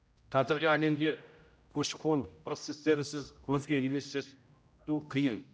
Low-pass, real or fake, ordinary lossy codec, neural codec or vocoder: none; fake; none; codec, 16 kHz, 0.5 kbps, X-Codec, HuBERT features, trained on general audio